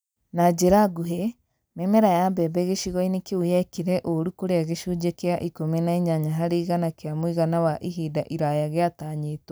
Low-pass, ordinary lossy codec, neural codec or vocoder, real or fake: none; none; none; real